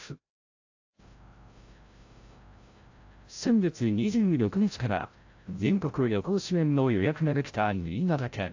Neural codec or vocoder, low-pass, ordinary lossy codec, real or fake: codec, 16 kHz, 0.5 kbps, FreqCodec, larger model; 7.2 kHz; AAC, 48 kbps; fake